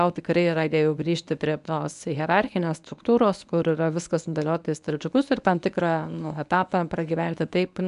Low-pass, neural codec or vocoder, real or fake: 10.8 kHz; codec, 24 kHz, 0.9 kbps, WavTokenizer, medium speech release version 1; fake